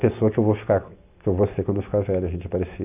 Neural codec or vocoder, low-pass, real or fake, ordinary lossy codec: none; 3.6 kHz; real; none